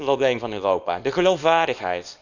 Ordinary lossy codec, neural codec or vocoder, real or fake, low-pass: none; codec, 24 kHz, 0.9 kbps, WavTokenizer, small release; fake; 7.2 kHz